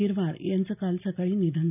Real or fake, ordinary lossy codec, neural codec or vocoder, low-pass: real; none; none; 3.6 kHz